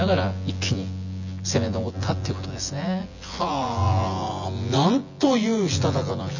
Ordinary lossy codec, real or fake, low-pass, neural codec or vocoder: none; fake; 7.2 kHz; vocoder, 24 kHz, 100 mel bands, Vocos